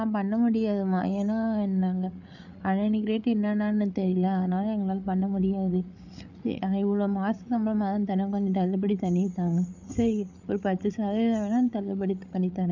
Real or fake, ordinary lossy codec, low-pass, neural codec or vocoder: fake; none; 7.2 kHz; codec, 16 kHz, 8 kbps, FreqCodec, larger model